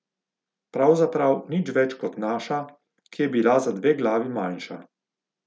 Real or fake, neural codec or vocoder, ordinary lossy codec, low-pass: real; none; none; none